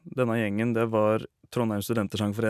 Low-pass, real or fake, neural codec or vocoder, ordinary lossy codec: 14.4 kHz; real; none; none